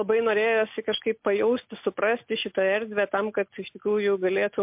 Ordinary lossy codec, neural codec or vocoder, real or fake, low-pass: MP3, 32 kbps; none; real; 3.6 kHz